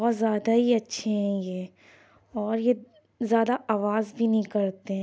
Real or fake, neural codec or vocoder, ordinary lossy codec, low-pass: real; none; none; none